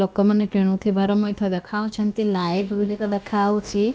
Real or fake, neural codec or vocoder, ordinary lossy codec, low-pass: fake; codec, 16 kHz, about 1 kbps, DyCAST, with the encoder's durations; none; none